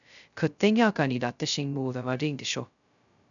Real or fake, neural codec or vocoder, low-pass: fake; codec, 16 kHz, 0.2 kbps, FocalCodec; 7.2 kHz